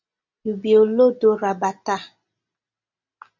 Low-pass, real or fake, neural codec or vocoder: 7.2 kHz; real; none